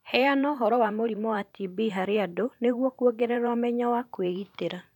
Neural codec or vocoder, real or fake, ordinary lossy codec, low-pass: vocoder, 44.1 kHz, 128 mel bands every 512 samples, BigVGAN v2; fake; none; 19.8 kHz